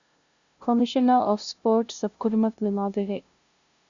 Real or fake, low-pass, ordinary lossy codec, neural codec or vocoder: fake; 7.2 kHz; Opus, 64 kbps; codec, 16 kHz, 0.5 kbps, FunCodec, trained on LibriTTS, 25 frames a second